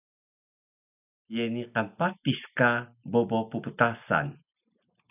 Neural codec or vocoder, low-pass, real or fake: none; 3.6 kHz; real